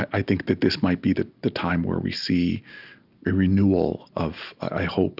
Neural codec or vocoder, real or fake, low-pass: none; real; 5.4 kHz